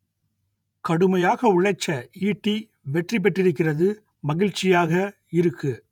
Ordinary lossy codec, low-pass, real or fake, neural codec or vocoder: none; 19.8 kHz; fake; vocoder, 48 kHz, 128 mel bands, Vocos